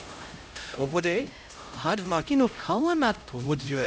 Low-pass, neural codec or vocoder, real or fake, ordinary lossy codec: none; codec, 16 kHz, 0.5 kbps, X-Codec, HuBERT features, trained on LibriSpeech; fake; none